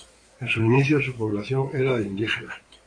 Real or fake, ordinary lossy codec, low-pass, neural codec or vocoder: fake; AAC, 48 kbps; 9.9 kHz; codec, 16 kHz in and 24 kHz out, 2.2 kbps, FireRedTTS-2 codec